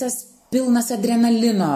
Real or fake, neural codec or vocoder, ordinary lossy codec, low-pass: real; none; MP3, 64 kbps; 14.4 kHz